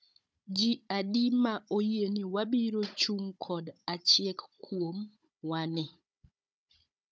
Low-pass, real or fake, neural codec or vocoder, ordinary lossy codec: none; fake; codec, 16 kHz, 16 kbps, FunCodec, trained on Chinese and English, 50 frames a second; none